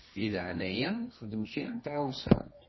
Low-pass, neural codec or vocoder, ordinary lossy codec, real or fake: 7.2 kHz; codec, 24 kHz, 0.9 kbps, WavTokenizer, medium music audio release; MP3, 24 kbps; fake